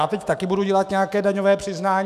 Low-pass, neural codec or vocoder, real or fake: 14.4 kHz; autoencoder, 48 kHz, 128 numbers a frame, DAC-VAE, trained on Japanese speech; fake